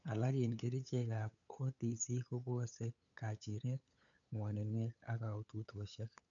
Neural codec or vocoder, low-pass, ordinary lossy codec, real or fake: codec, 16 kHz, 8 kbps, FunCodec, trained on LibriTTS, 25 frames a second; 7.2 kHz; none; fake